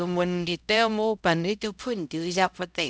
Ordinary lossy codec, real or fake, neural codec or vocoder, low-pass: none; fake; codec, 16 kHz, 0.5 kbps, X-Codec, HuBERT features, trained on LibriSpeech; none